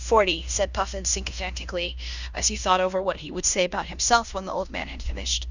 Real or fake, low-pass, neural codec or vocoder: fake; 7.2 kHz; codec, 16 kHz in and 24 kHz out, 0.9 kbps, LongCat-Audio-Codec, fine tuned four codebook decoder